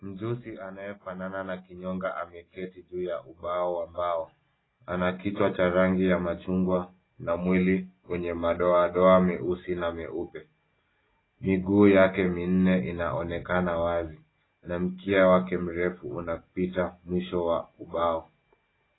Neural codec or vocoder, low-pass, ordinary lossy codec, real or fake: none; 7.2 kHz; AAC, 16 kbps; real